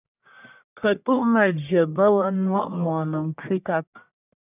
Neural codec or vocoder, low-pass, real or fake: codec, 44.1 kHz, 1.7 kbps, Pupu-Codec; 3.6 kHz; fake